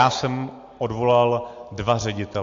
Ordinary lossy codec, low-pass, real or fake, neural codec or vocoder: MP3, 48 kbps; 7.2 kHz; real; none